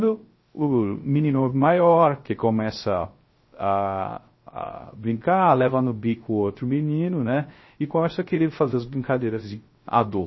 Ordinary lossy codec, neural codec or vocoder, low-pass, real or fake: MP3, 24 kbps; codec, 16 kHz, 0.3 kbps, FocalCodec; 7.2 kHz; fake